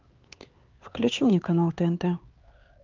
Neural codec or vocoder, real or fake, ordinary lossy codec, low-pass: codec, 16 kHz, 4 kbps, X-Codec, HuBERT features, trained on LibriSpeech; fake; Opus, 32 kbps; 7.2 kHz